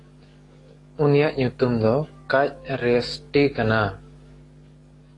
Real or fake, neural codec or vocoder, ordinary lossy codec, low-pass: fake; codec, 44.1 kHz, 7.8 kbps, DAC; AAC, 32 kbps; 10.8 kHz